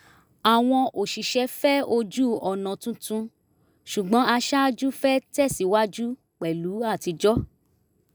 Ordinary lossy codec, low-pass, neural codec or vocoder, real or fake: none; none; none; real